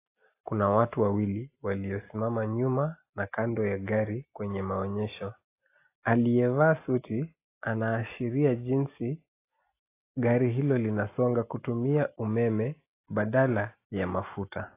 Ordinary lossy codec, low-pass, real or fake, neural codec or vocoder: AAC, 24 kbps; 3.6 kHz; real; none